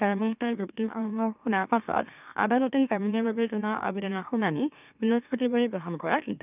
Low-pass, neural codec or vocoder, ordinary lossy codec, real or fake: 3.6 kHz; autoencoder, 44.1 kHz, a latent of 192 numbers a frame, MeloTTS; none; fake